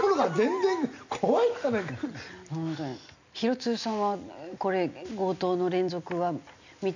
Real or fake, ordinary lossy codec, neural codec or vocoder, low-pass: real; none; none; 7.2 kHz